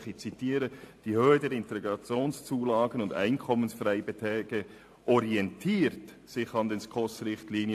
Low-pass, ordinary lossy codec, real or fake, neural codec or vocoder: 14.4 kHz; AAC, 64 kbps; fake; vocoder, 44.1 kHz, 128 mel bands every 512 samples, BigVGAN v2